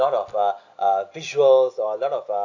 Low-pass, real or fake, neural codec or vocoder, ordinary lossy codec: 7.2 kHz; real; none; AAC, 48 kbps